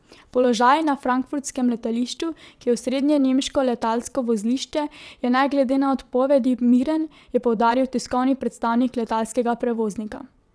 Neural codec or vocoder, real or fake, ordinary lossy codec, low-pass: vocoder, 22.05 kHz, 80 mel bands, WaveNeXt; fake; none; none